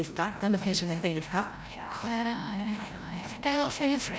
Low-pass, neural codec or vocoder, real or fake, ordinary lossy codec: none; codec, 16 kHz, 0.5 kbps, FreqCodec, larger model; fake; none